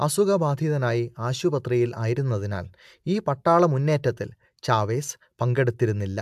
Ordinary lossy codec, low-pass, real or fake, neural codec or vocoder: AAC, 96 kbps; 14.4 kHz; real; none